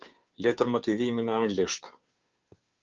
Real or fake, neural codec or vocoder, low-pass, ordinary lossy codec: fake; codec, 16 kHz, 2 kbps, FunCodec, trained on Chinese and English, 25 frames a second; 7.2 kHz; Opus, 24 kbps